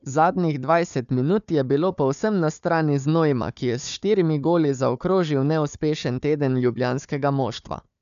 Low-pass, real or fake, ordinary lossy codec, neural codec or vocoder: 7.2 kHz; fake; none; codec, 16 kHz, 4 kbps, FunCodec, trained on Chinese and English, 50 frames a second